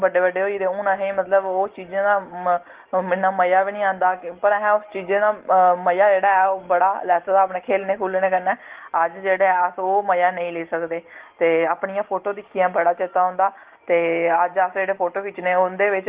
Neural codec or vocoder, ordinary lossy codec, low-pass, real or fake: none; Opus, 16 kbps; 3.6 kHz; real